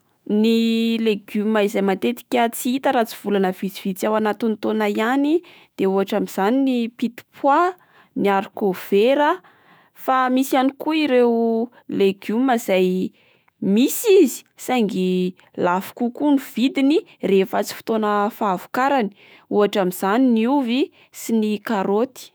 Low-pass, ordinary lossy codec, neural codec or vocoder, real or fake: none; none; autoencoder, 48 kHz, 128 numbers a frame, DAC-VAE, trained on Japanese speech; fake